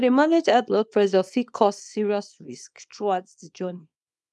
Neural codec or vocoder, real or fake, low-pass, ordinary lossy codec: codec, 24 kHz, 0.9 kbps, WavTokenizer, small release; fake; none; none